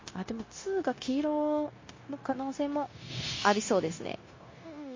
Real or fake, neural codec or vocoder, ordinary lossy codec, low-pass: fake; codec, 16 kHz, 0.9 kbps, LongCat-Audio-Codec; MP3, 32 kbps; 7.2 kHz